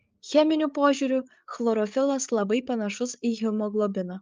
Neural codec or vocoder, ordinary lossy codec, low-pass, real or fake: codec, 16 kHz, 8 kbps, FreqCodec, larger model; Opus, 24 kbps; 7.2 kHz; fake